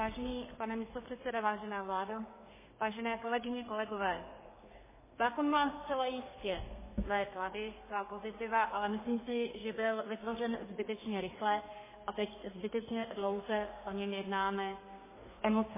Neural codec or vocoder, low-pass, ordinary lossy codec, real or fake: codec, 32 kHz, 1.9 kbps, SNAC; 3.6 kHz; MP3, 16 kbps; fake